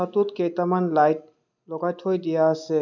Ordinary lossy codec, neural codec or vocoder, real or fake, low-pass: none; none; real; 7.2 kHz